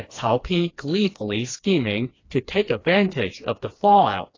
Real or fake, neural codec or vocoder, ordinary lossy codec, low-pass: fake; codec, 16 kHz, 2 kbps, FreqCodec, smaller model; AAC, 32 kbps; 7.2 kHz